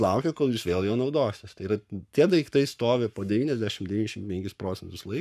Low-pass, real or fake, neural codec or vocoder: 14.4 kHz; fake; codec, 44.1 kHz, 7.8 kbps, Pupu-Codec